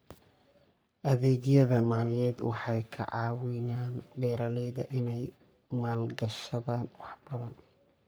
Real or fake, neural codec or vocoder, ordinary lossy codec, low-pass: fake; codec, 44.1 kHz, 3.4 kbps, Pupu-Codec; none; none